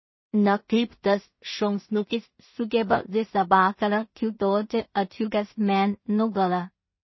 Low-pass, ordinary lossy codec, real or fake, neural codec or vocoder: 7.2 kHz; MP3, 24 kbps; fake; codec, 16 kHz in and 24 kHz out, 0.4 kbps, LongCat-Audio-Codec, two codebook decoder